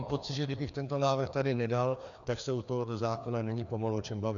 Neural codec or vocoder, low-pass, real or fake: codec, 16 kHz, 2 kbps, FreqCodec, larger model; 7.2 kHz; fake